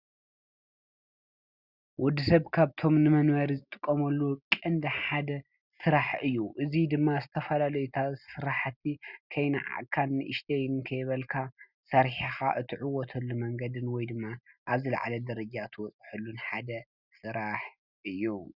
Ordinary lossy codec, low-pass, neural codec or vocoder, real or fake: Opus, 64 kbps; 5.4 kHz; none; real